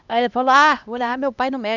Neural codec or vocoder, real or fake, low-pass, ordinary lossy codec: codec, 16 kHz, 1 kbps, X-Codec, HuBERT features, trained on LibriSpeech; fake; 7.2 kHz; none